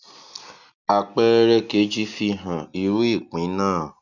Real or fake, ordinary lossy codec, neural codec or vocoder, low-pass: real; none; none; 7.2 kHz